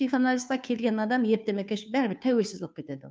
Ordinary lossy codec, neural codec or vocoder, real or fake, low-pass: none; codec, 16 kHz, 2 kbps, FunCodec, trained on Chinese and English, 25 frames a second; fake; none